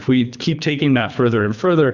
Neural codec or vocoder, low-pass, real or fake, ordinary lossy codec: codec, 24 kHz, 3 kbps, HILCodec; 7.2 kHz; fake; Opus, 64 kbps